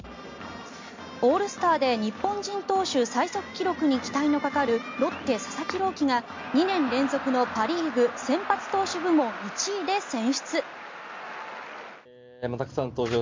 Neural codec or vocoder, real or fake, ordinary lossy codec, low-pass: none; real; MP3, 48 kbps; 7.2 kHz